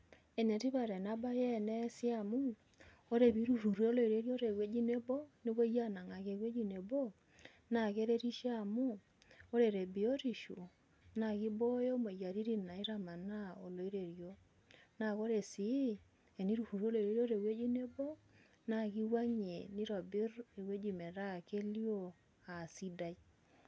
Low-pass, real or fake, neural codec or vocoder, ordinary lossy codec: none; real; none; none